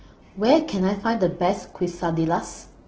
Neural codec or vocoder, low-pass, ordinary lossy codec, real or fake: none; 7.2 kHz; Opus, 16 kbps; real